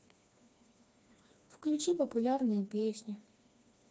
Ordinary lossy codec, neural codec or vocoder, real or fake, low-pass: none; codec, 16 kHz, 2 kbps, FreqCodec, smaller model; fake; none